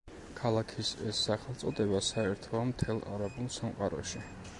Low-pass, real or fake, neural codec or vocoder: 10.8 kHz; real; none